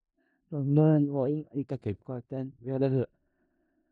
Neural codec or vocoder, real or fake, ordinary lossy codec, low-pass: codec, 16 kHz in and 24 kHz out, 0.4 kbps, LongCat-Audio-Codec, four codebook decoder; fake; none; 10.8 kHz